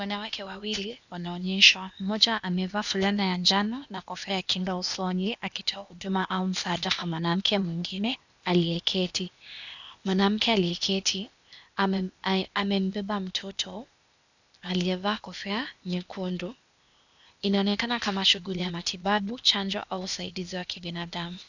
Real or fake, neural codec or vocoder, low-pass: fake; codec, 16 kHz, 0.8 kbps, ZipCodec; 7.2 kHz